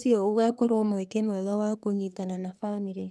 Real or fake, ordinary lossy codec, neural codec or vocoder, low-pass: fake; none; codec, 24 kHz, 1 kbps, SNAC; none